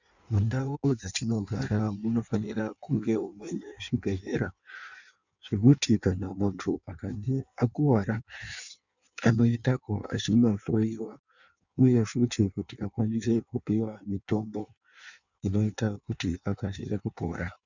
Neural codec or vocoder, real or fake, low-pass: codec, 16 kHz in and 24 kHz out, 1.1 kbps, FireRedTTS-2 codec; fake; 7.2 kHz